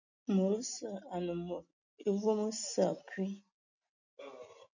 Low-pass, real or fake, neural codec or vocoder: 7.2 kHz; real; none